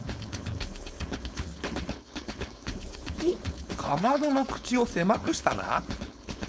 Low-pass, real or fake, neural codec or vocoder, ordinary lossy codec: none; fake; codec, 16 kHz, 4.8 kbps, FACodec; none